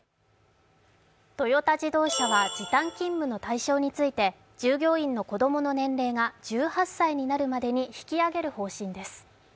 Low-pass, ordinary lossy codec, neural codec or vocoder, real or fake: none; none; none; real